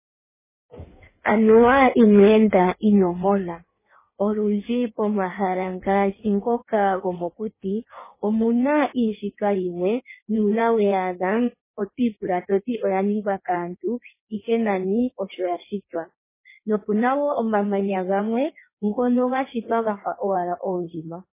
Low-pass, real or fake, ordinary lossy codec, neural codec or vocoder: 3.6 kHz; fake; MP3, 16 kbps; codec, 16 kHz in and 24 kHz out, 1.1 kbps, FireRedTTS-2 codec